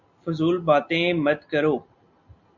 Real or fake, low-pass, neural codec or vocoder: real; 7.2 kHz; none